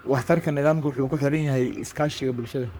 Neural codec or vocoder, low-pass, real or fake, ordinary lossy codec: codec, 44.1 kHz, 3.4 kbps, Pupu-Codec; none; fake; none